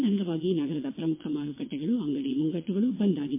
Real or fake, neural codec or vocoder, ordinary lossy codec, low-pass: fake; vocoder, 22.05 kHz, 80 mel bands, WaveNeXt; MP3, 24 kbps; 3.6 kHz